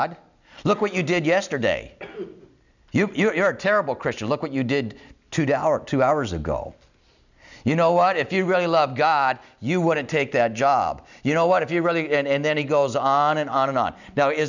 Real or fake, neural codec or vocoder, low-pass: real; none; 7.2 kHz